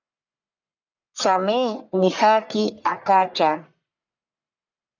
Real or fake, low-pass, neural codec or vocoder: fake; 7.2 kHz; codec, 44.1 kHz, 1.7 kbps, Pupu-Codec